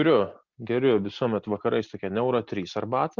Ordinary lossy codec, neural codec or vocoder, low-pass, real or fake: Opus, 64 kbps; none; 7.2 kHz; real